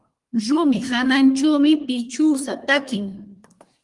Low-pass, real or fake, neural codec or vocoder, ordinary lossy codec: 10.8 kHz; fake; codec, 24 kHz, 1 kbps, SNAC; Opus, 24 kbps